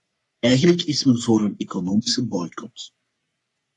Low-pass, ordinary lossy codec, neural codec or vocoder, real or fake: 10.8 kHz; AAC, 48 kbps; codec, 44.1 kHz, 3.4 kbps, Pupu-Codec; fake